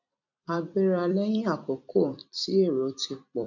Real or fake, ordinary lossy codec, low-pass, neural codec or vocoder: fake; none; 7.2 kHz; vocoder, 44.1 kHz, 128 mel bands every 256 samples, BigVGAN v2